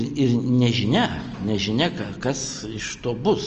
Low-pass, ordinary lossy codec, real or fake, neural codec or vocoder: 7.2 kHz; Opus, 24 kbps; real; none